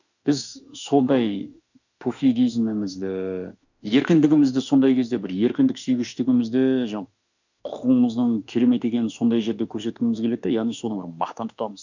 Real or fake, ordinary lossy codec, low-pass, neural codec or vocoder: fake; none; 7.2 kHz; autoencoder, 48 kHz, 32 numbers a frame, DAC-VAE, trained on Japanese speech